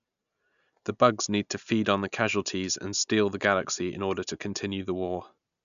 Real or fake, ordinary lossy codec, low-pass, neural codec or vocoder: real; none; 7.2 kHz; none